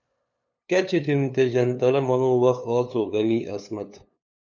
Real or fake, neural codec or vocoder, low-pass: fake; codec, 16 kHz, 2 kbps, FunCodec, trained on LibriTTS, 25 frames a second; 7.2 kHz